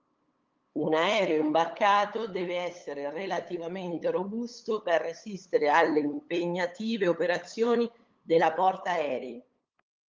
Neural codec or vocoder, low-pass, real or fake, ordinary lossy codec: codec, 16 kHz, 8 kbps, FunCodec, trained on LibriTTS, 25 frames a second; 7.2 kHz; fake; Opus, 24 kbps